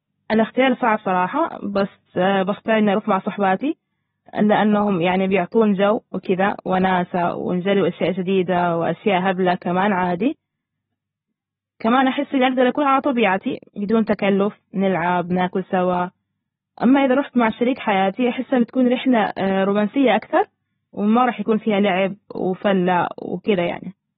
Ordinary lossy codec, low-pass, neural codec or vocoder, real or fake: AAC, 16 kbps; 19.8 kHz; none; real